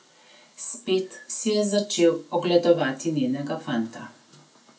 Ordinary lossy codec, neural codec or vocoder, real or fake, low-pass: none; none; real; none